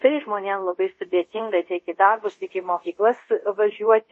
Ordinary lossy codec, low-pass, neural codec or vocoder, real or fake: MP3, 32 kbps; 10.8 kHz; codec, 24 kHz, 0.5 kbps, DualCodec; fake